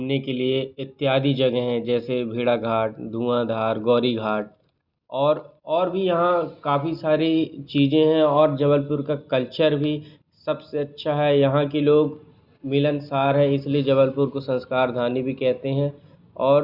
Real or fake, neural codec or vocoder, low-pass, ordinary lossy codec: real; none; 5.4 kHz; Opus, 64 kbps